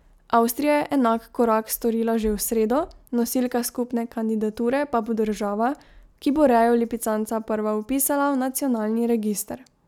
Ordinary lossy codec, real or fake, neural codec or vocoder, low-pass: none; real; none; 19.8 kHz